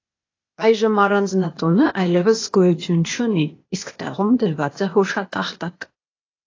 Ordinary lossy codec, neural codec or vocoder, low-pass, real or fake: AAC, 32 kbps; codec, 16 kHz, 0.8 kbps, ZipCodec; 7.2 kHz; fake